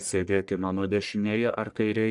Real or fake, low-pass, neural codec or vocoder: fake; 10.8 kHz; codec, 44.1 kHz, 1.7 kbps, Pupu-Codec